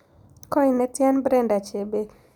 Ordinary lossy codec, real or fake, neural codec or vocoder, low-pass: none; real; none; 19.8 kHz